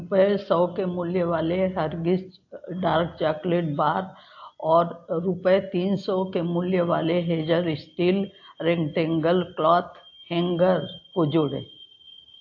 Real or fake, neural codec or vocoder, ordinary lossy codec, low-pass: real; none; AAC, 48 kbps; 7.2 kHz